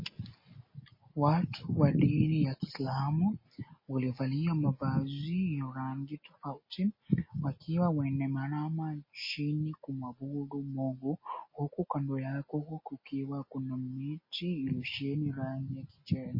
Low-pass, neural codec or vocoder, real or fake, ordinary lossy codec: 5.4 kHz; none; real; MP3, 24 kbps